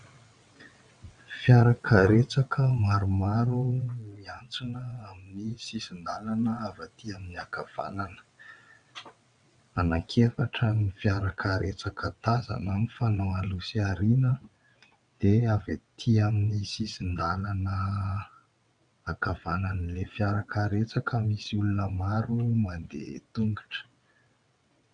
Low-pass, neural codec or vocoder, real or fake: 9.9 kHz; vocoder, 22.05 kHz, 80 mel bands, WaveNeXt; fake